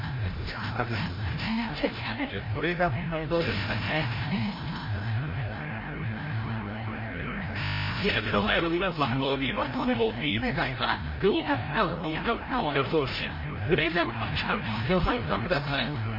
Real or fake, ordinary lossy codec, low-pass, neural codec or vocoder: fake; MP3, 24 kbps; 5.4 kHz; codec, 16 kHz, 0.5 kbps, FreqCodec, larger model